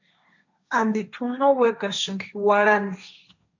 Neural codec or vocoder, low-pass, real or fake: codec, 16 kHz, 1.1 kbps, Voila-Tokenizer; 7.2 kHz; fake